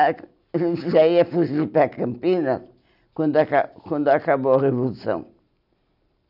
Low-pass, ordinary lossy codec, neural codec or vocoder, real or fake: 5.4 kHz; none; none; real